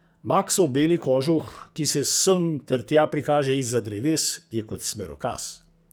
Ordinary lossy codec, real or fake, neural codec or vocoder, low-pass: none; fake; codec, 44.1 kHz, 2.6 kbps, SNAC; none